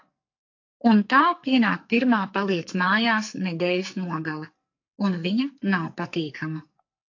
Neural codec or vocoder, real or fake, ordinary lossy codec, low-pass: codec, 44.1 kHz, 2.6 kbps, SNAC; fake; AAC, 48 kbps; 7.2 kHz